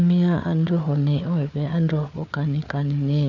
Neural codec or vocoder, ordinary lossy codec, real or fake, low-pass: codec, 16 kHz, 4 kbps, FunCodec, trained on Chinese and English, 50 frames a second; none; fake; 7.2 kHz